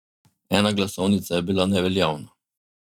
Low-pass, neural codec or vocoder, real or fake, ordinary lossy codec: 19.8 kHz; vocoder, 44.1 kHz, 128 mel bands every 512 samples, BigVGAN v2; fake; none